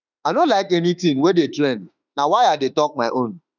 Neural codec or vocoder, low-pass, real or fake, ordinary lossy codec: autoencoder, 48 kHz, 32 numbers a frame, DAC-VAE, trained on Japanese speech; 7.2 kHz; fake; none